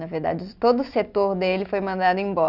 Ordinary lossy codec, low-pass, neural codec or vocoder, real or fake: none; 5.4 kHz; none; real